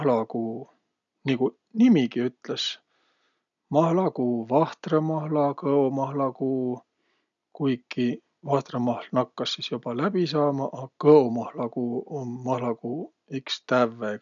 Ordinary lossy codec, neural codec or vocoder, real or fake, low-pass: none; none; real; 7.2 kHz